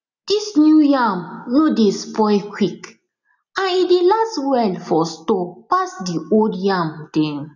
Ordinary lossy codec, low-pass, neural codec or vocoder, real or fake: none; 7.2 kHz; none; real